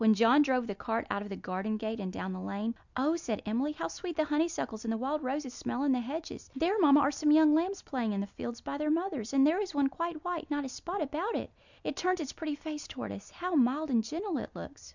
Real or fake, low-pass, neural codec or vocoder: real; 7.2 kHz; none